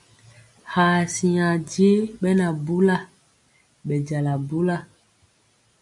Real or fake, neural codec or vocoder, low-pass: real; none; 10.8 kHz